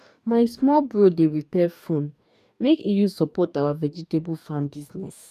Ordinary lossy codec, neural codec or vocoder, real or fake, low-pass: none; codec, 44.1 kHz, 2.6 kbps, DAC; fake; 14.4 kHz